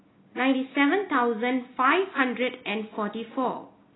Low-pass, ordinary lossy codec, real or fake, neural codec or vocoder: 7.2 kHz; AAC, 16 kbps; real; none